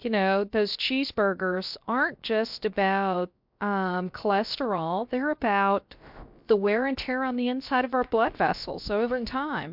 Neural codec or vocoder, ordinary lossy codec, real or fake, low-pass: codec, 16 kHz, about 1 kbps, DyCAST, with the encoder's durations; MP3, 48 kbps; fake; 5.4 kHz